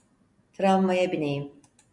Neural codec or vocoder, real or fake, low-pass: none; real; 10.8 kHz